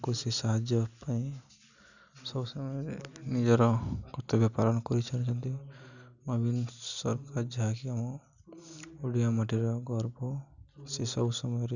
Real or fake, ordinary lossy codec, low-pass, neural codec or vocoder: real; none; 7.2 kHz; none